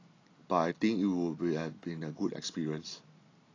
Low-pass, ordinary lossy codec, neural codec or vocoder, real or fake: 7.2 kHz; AAC, 32 kbps; none; real